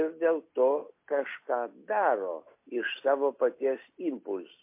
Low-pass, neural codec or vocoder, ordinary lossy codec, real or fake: 3.6 kHz; none; MP3, 24 kbps; real